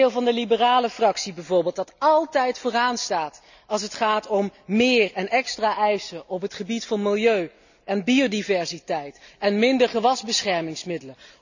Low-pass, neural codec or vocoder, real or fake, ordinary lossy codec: 7.2 kHz; none; real; none